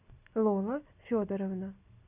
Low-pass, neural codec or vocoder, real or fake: 3.6 kHz; none; real